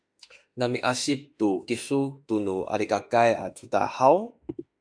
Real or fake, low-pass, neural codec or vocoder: fake; 9.9 kHz; autoencoder, 48 kHz, 32 numbers a frame, DAC-VAE, trained on Japanese speech